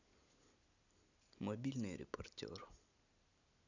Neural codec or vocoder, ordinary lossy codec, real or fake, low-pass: none; none; real; 7.2 kHz